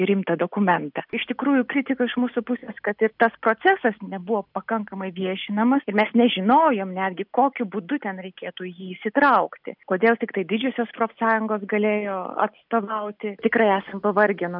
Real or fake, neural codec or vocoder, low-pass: real; none; 5.4 kHz